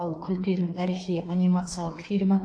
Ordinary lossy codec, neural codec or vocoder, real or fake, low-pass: AAC, 48 kbps; codec, 24 kHz, 1 kbps, SNAC; fake; 9.9 kHz